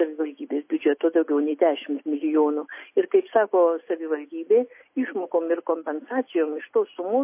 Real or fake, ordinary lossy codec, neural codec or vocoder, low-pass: real; MP3, 24 kbps; none; 3.6 kHz